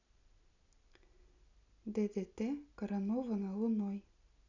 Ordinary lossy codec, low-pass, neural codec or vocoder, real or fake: none; 7.2 kHz; none; real